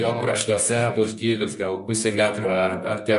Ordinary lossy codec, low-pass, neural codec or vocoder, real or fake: MP3, 64 kbps; 10.8 kHz; codec, 24 kHz, 0.9 kbps, WavTokenizer, medium music audio release; fake